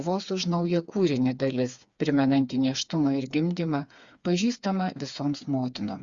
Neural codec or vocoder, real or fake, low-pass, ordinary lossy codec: codec, 16 kHz, 4 kbps, FreqCodec, smaller model; fake; 7.2 kHz; Opus, 64 kbps